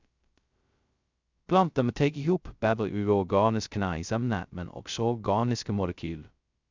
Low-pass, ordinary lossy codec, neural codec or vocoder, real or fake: 7.2 kHz; Opus, 64 kbps; codec, 16 kHz, 0.2 kbps, FocalCodec; fake